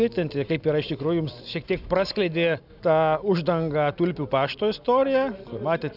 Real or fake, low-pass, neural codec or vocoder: real; 5.4 kHz; none